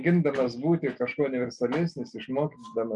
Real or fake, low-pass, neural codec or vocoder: real; 10.8 kHz; none